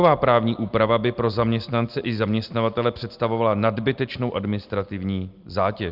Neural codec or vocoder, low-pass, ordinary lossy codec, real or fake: none; 5.4 kHz; Opus, 24 kbps; real